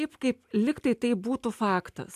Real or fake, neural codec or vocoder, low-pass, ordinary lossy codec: real; none; 14.4 kHz; AAC, 96 kbps